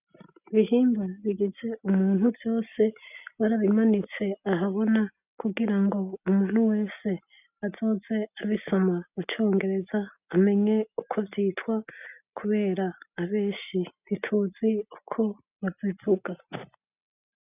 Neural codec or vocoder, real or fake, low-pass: none; real; 3.6 kHz